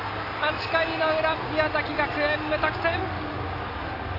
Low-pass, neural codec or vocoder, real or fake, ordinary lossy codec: 5.4 kHz; none; real; AAC, 32 kbps